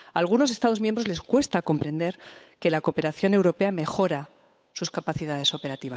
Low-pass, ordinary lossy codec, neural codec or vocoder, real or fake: none; none; codec, 16 kHz, 8 kbps, FunCodec, trained on Chinese and English, 25 frames a second; fake